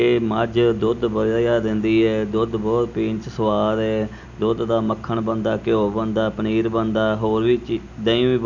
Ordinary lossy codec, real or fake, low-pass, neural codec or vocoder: none; real; 7.2 kHz; none